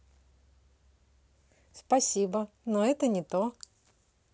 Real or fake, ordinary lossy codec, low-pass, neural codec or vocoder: real; none; none; none